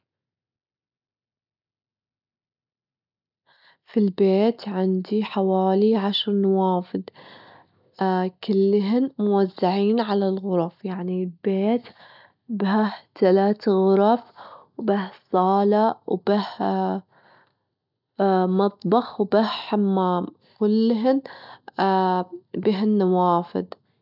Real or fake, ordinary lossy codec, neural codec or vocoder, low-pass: real; none; none; 5.4 kHz